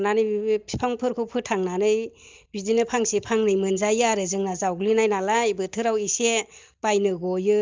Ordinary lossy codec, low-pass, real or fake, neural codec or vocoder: Opus, 24 kbps; 7.2 kHz; real; none